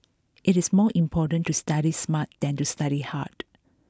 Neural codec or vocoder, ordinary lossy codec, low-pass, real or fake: none; none; none; real